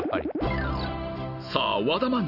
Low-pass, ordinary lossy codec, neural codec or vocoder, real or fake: 5.4 kHz; none; none; real